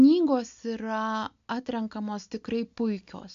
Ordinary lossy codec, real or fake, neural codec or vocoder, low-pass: AAC, 64 kbps; real; none; 7.2 kHz